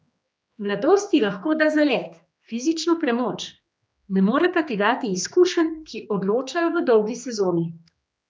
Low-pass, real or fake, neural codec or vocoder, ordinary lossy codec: none; fake; codec, 16 kHz, 2 kbps, X-Codec, HuBERT features, trained on general audio; none